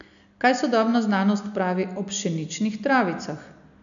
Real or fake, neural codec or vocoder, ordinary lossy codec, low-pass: real; none; none; 7.2 kHz